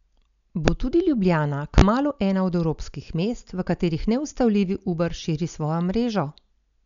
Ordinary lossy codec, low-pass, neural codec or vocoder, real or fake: none; 7.2 kHz; none; real